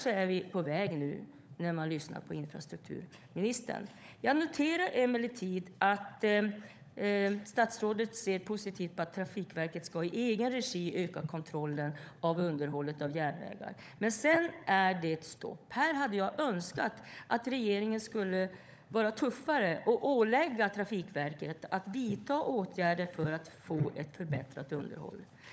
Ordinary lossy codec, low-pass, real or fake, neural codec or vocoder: none; none; fake; codec, 16 kHz, 16 kbps, FunCodec, trained on LibriTTS, 50 frames a second